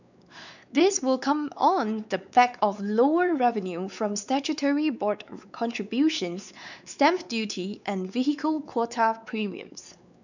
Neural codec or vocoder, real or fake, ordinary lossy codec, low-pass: codec, 16 kHz, 4 kbps, X-Codec, WavLM features, trained on Multilingual LibriSpeech; fake; none; 7.2 kHz